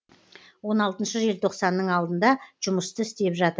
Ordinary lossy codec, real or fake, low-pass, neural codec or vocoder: none; real; none; none